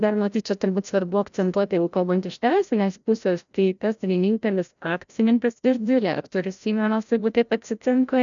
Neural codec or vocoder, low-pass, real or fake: codec, 16 kHz, 0.5 kbps, FreqCodec, larger model; 7.2 kHz; fake